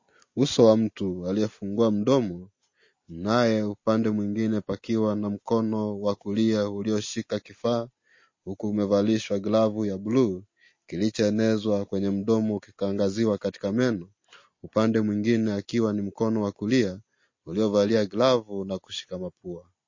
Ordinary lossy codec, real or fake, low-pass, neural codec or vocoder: MP3, 32 kbps; real; 7.2 kHz; none